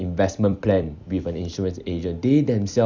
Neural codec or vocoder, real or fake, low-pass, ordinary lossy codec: vocoder, 44.1 kHz, 128 mel bands every 256 samples, BigVGAN v2; fake; 7.2 kHz; Opus, 64 kbps